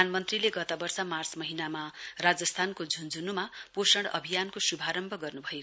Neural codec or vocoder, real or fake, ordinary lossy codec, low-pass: none; real; none; none